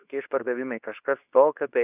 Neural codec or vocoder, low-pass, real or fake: codec, 24 kHz, 0.9 kbps, WavTokenizer, medium speech release version 2; 3.6 kHz; fake